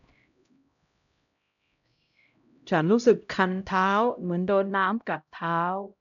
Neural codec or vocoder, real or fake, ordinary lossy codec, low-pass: codec, 16 kHz, 0.5 kbps, X-Codec, HuBERT features, trained on LibriSpeech; fake; none; 7.2 kHz